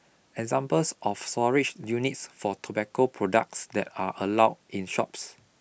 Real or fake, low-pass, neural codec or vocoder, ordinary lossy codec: real; none; none; none